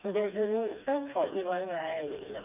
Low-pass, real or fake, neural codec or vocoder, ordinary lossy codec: 3.6 kHz; fake; codec, 16 kHz, 2 kbps, FreqCodec, smaller model; none